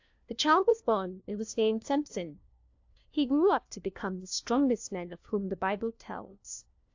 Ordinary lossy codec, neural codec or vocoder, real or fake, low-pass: AAC, 48 kbps; codec, 16 kHz, 1 kbps, FunCodec, trained on LibriTTS, 50 frames a second; fake; 7.2 kHz